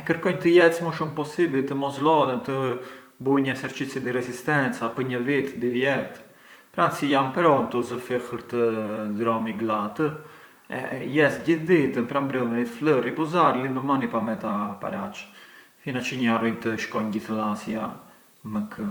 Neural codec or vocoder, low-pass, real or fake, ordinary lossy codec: vocoder, 44.1 kHz, 128 mel bands, Pupu-Vocoder; none; fake; none